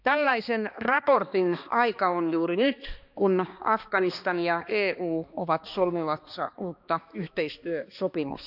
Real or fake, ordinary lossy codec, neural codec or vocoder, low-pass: fake; none; codec, 16 kHz, 2 kbps, X-Codec, HuBERT features, trained on balanced general audio; 5.4 kHz